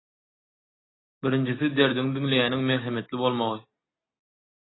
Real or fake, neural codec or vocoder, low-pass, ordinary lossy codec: real; none; 7.2 kHz; AAC, 16 kbps